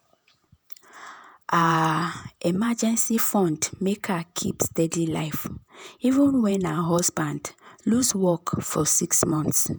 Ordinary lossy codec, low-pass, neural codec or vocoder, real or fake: none; none; vocoder, 48 kHz, 128 mel bands, Vocos; fake